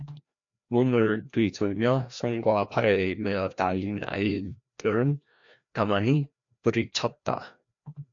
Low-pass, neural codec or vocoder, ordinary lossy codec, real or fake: 7.2 kHz; codec, 16 kHz, 1 kbps, FreqCodec, larger model; AAC, 64 kbps; fake